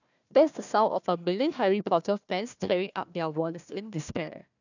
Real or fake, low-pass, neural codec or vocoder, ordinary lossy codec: fake; 7.2 kHz; codec, 16 kHz, 1 kbps, FunCodec, trained on Chinese and English, 50 frames a second; none